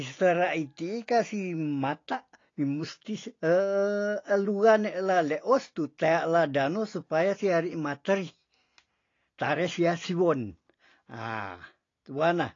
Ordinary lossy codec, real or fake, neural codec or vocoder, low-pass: AAC, 32 kbps; real; none; 7.2 kHz